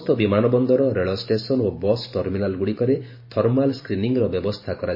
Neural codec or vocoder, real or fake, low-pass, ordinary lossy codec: vocoder, 44.1 kHz, 128 mel bands every 512 samples, BigVGAN v2; fake; 5.4 kHz; MP3, 24 kbps